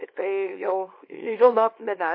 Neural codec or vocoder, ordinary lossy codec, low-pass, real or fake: codec, 24 kHz, 0.9 kbps, WavTokenizer, small release; MP3, 32 kbps; 5.4 kHz; fake